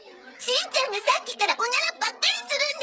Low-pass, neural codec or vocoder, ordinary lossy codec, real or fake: none; codec, 16 kHz, 16 kbps, FreqCodec, smaller model; none; fake